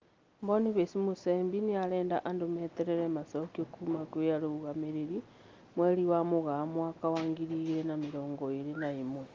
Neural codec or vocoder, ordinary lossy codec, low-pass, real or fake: none; Opus, 32 kbps; 7.2 kHz; real